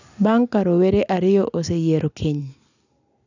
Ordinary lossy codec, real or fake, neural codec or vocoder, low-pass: none; real; none; 7.2 kHz